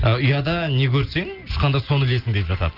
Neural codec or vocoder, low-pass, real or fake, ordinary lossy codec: none; 5.4 kHz; real; Opus, 32 kbps